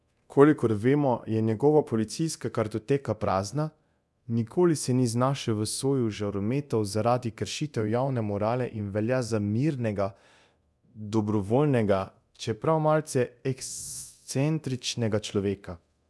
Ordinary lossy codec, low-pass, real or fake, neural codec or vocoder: none; none; fake; codec, 24 kHz, 0.9 kbps, DualCodec